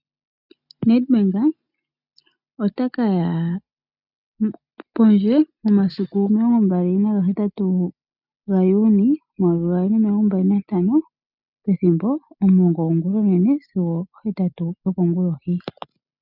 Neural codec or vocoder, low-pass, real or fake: none; 5.4 kHz; real